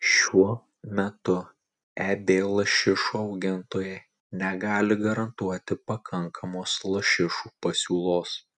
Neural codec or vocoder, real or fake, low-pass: none; real; 10.8 kHz